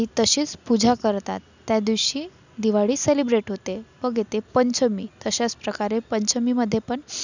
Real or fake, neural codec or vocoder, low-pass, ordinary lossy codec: real; none; 7.2 kHz; none